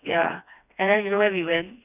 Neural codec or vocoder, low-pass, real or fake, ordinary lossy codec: codec, 16 kHz, 2 kbps, FreqCodec, smaller model; 3.6 kHz; fake; none